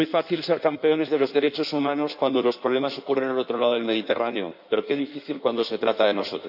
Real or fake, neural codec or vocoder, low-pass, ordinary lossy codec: fake; codec, 16 kHz in and 24 kHz out, 1.1 kbps, FireRedTTS-2 codec; 5.4 kHz; none